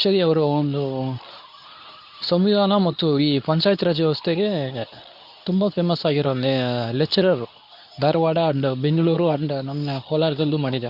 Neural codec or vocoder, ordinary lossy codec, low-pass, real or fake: codec, 24 kHz, 0.9 kbps, WavTokenizer, medium speech release version 2; none; 5.4 kHz; fake